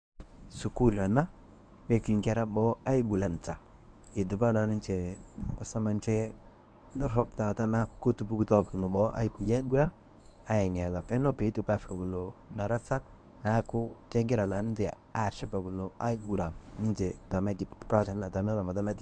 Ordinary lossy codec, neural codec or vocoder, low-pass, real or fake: none; codec, 24 kHz, 0.9 kbps, WavTokenizer, medium speech release version 1; 9.9 kHz; fake